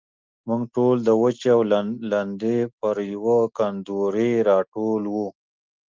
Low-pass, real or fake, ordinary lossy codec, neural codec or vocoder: 7.2 kHz; real; Opus, 32 kbps; none